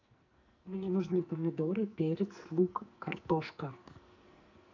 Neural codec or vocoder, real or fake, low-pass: codec, 44.1 kHz, 2.6 kbps, SNAC; fake; 7.2 kHz